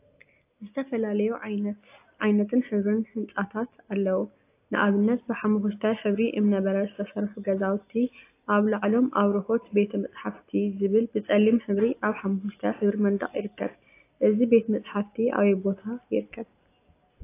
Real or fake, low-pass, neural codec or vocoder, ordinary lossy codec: real; 3.6 kHz; none; AAC, 24 kbps